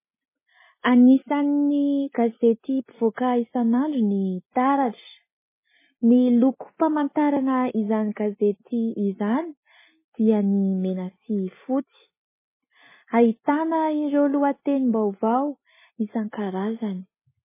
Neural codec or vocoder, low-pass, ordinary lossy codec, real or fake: none; 3.6 kHz; MP3, 16 kbps; real